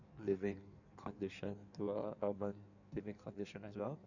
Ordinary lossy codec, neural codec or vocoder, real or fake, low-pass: none; codec, 16 kHz in and 24 kHz out, 1.1 kbps, FireRedTTS-2 codec; fake; 7.2 kHz